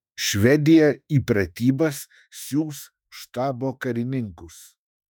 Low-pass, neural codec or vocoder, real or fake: 19.8 kHz; autoencoder, 48 kHz, 32 numbers a frame, DAC-VAE, trained on Japanese speech; fake